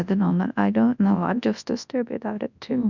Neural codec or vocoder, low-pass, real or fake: codec, 24 kHz, 0.9 kbps, WavTokenizer, large speech release; 7.2 kHz; fake